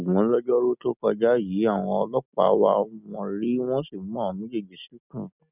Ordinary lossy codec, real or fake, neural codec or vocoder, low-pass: none; real; none; 3.6 kHz